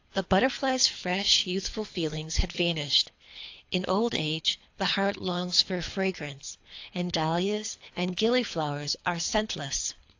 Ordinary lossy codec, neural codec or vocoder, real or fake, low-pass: AAC, 48 kbps; codec, 24 kHz, 3 kbps, HILCodec; fake; 7.2 kHz